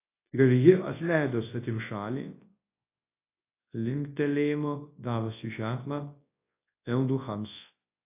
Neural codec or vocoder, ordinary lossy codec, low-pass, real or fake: codec, 24 kHz, 0.9 kbps, WavTokenizer, large speech release; AAC, 24 kbps; 3.6 kHz; fake